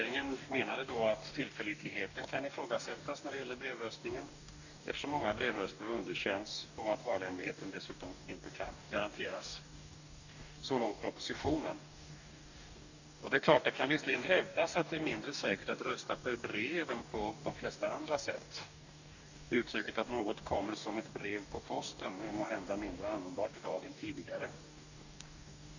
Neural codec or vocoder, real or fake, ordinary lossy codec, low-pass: codec, 44.1 kHz, 2.6 kbps, DAC; fake; none; 7.2 kHz